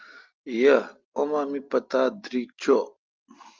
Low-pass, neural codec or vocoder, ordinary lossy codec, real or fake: 7.2 kHz; none; Opus, 24 kbps; real